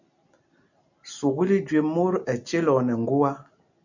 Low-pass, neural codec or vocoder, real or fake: 7.2 kHz; none; real